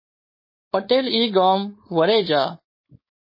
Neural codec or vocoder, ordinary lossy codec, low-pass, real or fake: codec, 16 kHz, 4.8 kbps, FACodec; MP3, 24 kbps; 5.4 kHz; fake